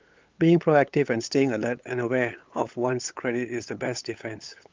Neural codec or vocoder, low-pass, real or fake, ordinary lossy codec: codec, 16 kHz, 8 kbps, FunCodec, trained on LibriTTS, 25 frames a second; 7.2 kHz; fake; Opus, 24 kbps